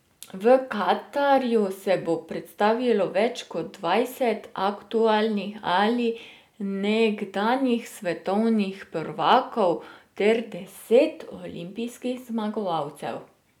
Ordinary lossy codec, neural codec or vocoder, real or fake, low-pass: none; none; real; 19.8 kHz